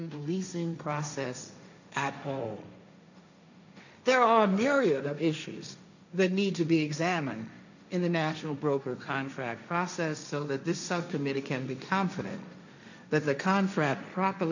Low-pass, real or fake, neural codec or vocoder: 7.2 kHz; fake; codec, 16 kHz, 1.1 kbps, Voila-Tokenizer